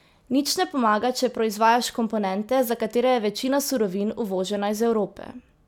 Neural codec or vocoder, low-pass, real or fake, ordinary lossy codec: none; 19.8 kHz; real; none